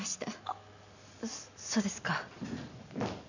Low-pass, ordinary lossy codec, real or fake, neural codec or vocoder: 7.2 kHz; none; real; none